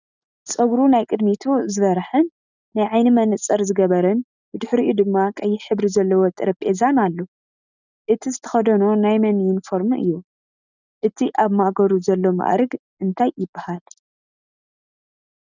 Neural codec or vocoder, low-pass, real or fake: none; 7.2 kHz; real